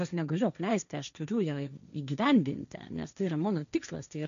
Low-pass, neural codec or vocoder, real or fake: 7.2 kHz; codec, 16 kHz, 1.1 kbps, Voila-Tokenizer; fake